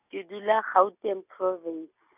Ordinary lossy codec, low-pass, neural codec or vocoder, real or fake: none; 3.6 kHz; none; real